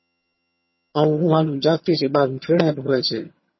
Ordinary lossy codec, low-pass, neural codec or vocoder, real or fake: MP3, 24 kbps; 7.2 kHz; vocoder, 22.05 kHz, 80 mel bands, HiFi-GAN; fake